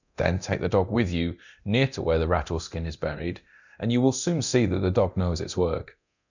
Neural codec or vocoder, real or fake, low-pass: codec, 24 kHz, 0.9 kbps, DualCodec; fake; 7.2 kHz